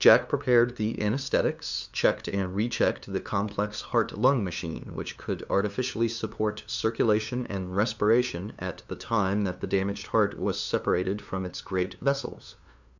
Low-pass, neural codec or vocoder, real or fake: 7.2 kHz; codec, 16 kHz, 2 kbps, FunCodec, trained on LibriTTS, 25 frames a second; fake